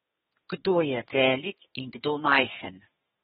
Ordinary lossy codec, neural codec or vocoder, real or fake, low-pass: AAC, 16 kbps; codec, 32 kHz, 1.9 kbps, SNAC; fake; 14.4 kHz